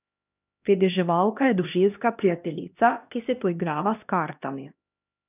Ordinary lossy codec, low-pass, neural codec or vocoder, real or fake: none; 3.6 kHz; codec, 16 kHz, 1 kbps, X-Codec, HuBERT features, trained on LibriSpeech; fake